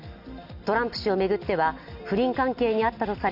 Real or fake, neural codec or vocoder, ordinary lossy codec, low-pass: real; none; none; 5.4 kHz